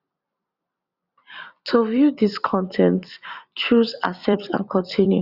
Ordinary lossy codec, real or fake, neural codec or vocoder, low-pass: Opus, 64 kbps; fake; vocoder, 44.1 kHz, 128 mel bands every 256 samples, BigVGAN v2; 5.4 kHz